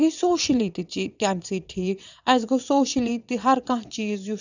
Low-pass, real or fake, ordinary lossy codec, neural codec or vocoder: 7.2 kHz; fake; none; vocoder, 22.05 kHz, 80 mel bands, WaveNeXt